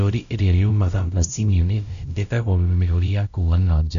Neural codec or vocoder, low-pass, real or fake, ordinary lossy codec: codec, 16 kHz, 0.5 kbps, X-Codec, WavLM features, trained on Multilingual LibriSpeech; 7.2 kHz; fake; none